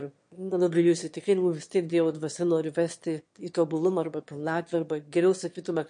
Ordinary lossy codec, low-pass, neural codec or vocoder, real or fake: MP3, 48 kbps; 9.9 kHz; autoencoder, 22.05 kHz, a latent of 192 numbers a frame, VITS, trained on one speaker; fake